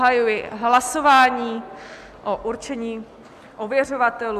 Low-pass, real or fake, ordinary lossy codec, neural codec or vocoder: 14.4 kHz; real; AAC, 96 kbps; none